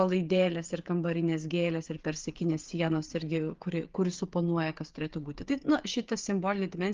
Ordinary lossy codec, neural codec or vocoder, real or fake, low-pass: Opus, 32 kbps; codec, 16 kHz, 8 kbps, FreqCodec, smaller model; fake; 7.2 kHz